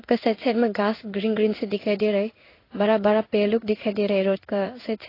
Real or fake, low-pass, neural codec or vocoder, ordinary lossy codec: fake; 5.4 kHz; codec, 16 kHz in and 24 kHz out, 1 kbps, XY-Tokenizer; AAC, 24 kbps